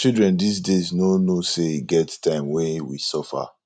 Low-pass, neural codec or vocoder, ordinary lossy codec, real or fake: 9.9 kHz; none; none; real